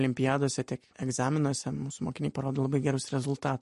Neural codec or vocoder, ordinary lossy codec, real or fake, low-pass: none; MP3, 48 kbps; real; 14.4 kHz